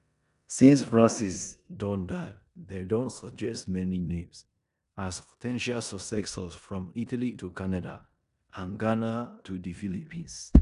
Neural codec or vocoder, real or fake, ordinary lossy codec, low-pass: codec, 16 kHz in and 24 kHz out, 0.9 kbps, LongCat-Audio-Codec, four codebook decoder; fake; none; 10.8 kHz